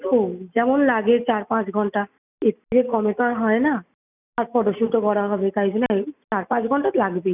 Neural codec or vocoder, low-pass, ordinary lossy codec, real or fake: none; 3.6 kHz; none; real